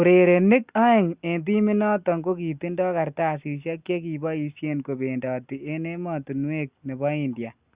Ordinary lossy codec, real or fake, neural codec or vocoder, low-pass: Opus, 64 kbps; real; none; 3.6 kHz